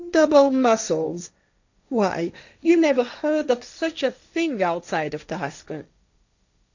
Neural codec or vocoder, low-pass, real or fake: codec, 16 kHz, 1.1 kbps, Voila-Tokenizer; 7.2 kHz; fake